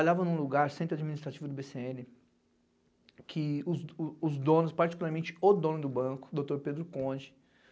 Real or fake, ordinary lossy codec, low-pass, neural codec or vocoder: real; none; none; none